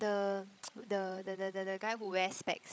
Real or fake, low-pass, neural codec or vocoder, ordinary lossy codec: fake; none; codec, 16 kHz, 16 kbps, FreqCodec, larger model; none